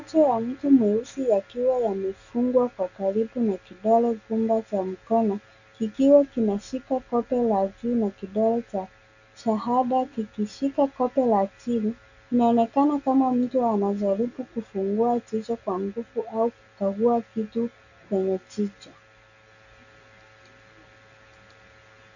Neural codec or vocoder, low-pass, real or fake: none; 7.2 kHz; real